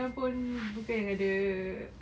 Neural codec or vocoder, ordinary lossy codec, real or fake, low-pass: none; none; real; none